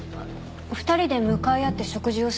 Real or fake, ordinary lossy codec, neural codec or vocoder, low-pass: real; none; none; none